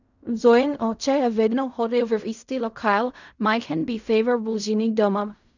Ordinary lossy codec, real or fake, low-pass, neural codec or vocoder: none; fake; 7.2 kHz; codec, 16 kHz in and 24 kHz out, 0.4 kbps, LongCat-Audio-Codec, fine tuned four codebook decoder